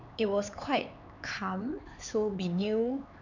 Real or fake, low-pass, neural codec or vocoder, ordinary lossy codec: fake; 7.2 kHz; codec, 16 kHz, 4 kbps, X-Codec, HuBERT features, trained on LibriSpeech; none